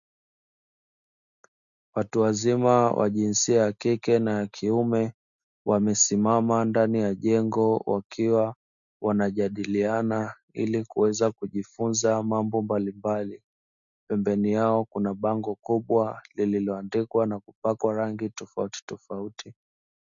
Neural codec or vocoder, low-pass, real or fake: none; 7.2 kHz; real